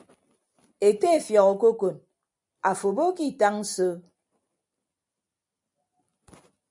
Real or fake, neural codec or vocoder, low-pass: real; none; 10.8 kHz